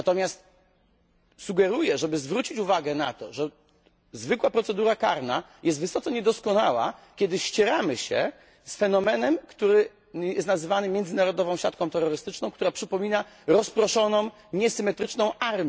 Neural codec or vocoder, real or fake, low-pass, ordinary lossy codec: none; real; none; none